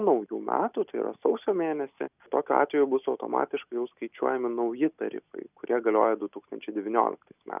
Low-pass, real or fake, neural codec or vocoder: 3.6 kHz; real; none